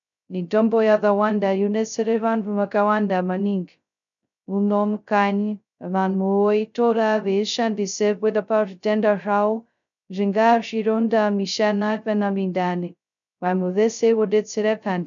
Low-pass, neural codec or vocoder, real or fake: 7.2 kHz; codec, 16 kHz, 0.2 kbps, FocalCodec; fake